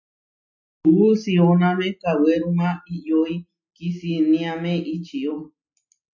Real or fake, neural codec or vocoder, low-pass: real; none; 7.2 kHz